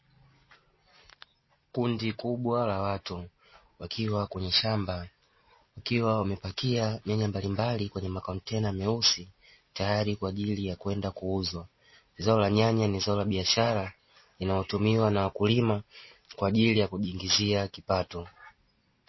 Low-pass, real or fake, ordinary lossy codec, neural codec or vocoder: 7.2 kHz; real; MP3, 24 kbps; none